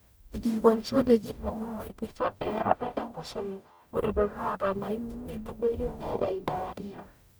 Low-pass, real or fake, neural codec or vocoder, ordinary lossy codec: none; fake; codec, 44.1 kHz, 0.9 kbps, DAC; none